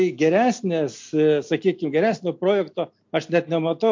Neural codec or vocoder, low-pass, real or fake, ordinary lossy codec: none; 7.2 kHz; real; MP3, 48 kbps